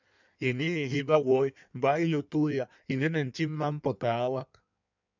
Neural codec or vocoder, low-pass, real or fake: codec, 16 kHz in and 24 kHz out, 1.1 kbps, FireRedTTS-2 codec; 7.2 kHz; fake